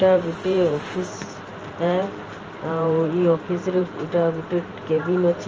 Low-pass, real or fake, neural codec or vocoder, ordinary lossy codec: 7.2 kHz; fake; vocoder, 44.1 kHz, 128 mel bands every 512 samples, BigVGAN v2; Opus, 24 kbps